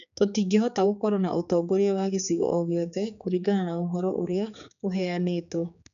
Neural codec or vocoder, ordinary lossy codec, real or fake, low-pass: codec, 16 kHz, 4 kbps, X-Codec, HuBERT features, trained on general audio; none; fake; 7.2 kHz